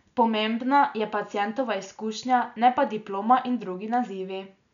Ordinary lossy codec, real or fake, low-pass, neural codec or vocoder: none; real; 7.2 kHz; none